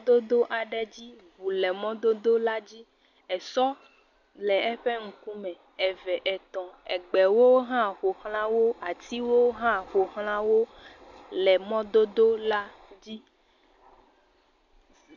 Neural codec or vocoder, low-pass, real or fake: none; 7.2 kHz; real